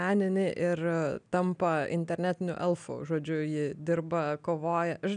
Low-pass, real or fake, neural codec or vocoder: 9.9 kHz; real; none